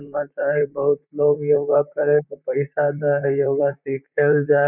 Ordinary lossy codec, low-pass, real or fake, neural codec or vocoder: none; 3.6 kHz; fake; vocoder, 44.1 kHz, 128 mel bands, Pupu-Vocoder